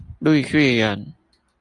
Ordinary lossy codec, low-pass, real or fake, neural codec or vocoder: Opus, 64 kbps; 10.8 kHz; real; none